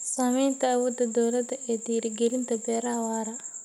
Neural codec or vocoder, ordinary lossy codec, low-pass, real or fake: none; none; 19.8 kHz; real